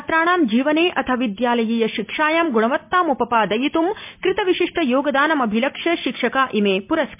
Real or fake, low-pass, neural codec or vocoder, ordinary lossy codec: real; 3.6 kHz; none; MP3, 32 kbps